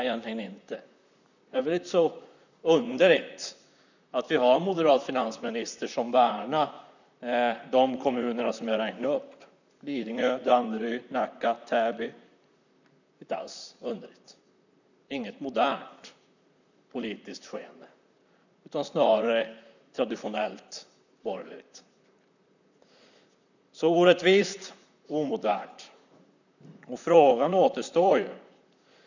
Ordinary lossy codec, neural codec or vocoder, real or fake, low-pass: none; vocoder, 44.1 kHz, 128 mel bands, Pupu-Vocoder; fake; 7.2 kHz